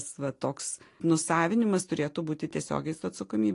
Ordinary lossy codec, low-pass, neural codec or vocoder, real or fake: AAC, 48 kbps; 10.8 kHz; none; real